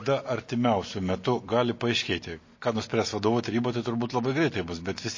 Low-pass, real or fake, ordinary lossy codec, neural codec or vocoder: 7.2 kHz; real; MP3, 32 kbps; none